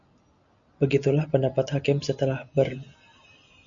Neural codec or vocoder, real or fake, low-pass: none; real; 7.2 kHz